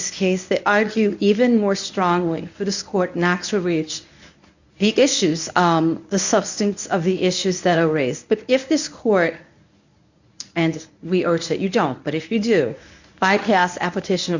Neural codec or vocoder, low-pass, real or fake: codec, 24 kHz, 0.9 kbps, WavTokenizer, medium speech release version 1; 7.2 kHz; fake